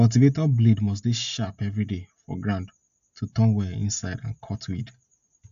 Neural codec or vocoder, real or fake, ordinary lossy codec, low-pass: none; real; AAC, 96 kbps; 7.2 kHz